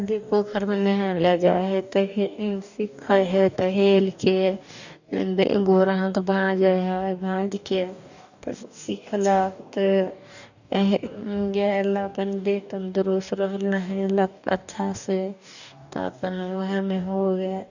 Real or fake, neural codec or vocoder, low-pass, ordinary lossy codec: fake; codec, 44.1 kHz, 2.6 kbps, DAC; 7.2 kHz; none